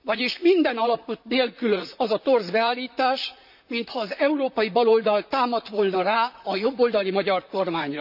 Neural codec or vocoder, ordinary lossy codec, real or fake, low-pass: vocoder, 44.1 kHz, 128 mel bands, Pupu-Vocoder; none; fake; 5.4 kHz